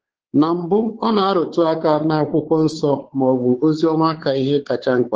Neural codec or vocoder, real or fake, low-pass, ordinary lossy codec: codec, 16 kHz, 4 kbps, X-Codec, WavLM features, trained on Multilingual LibriSpeech; fake; 7.2 kHz; Opus, 16 kbps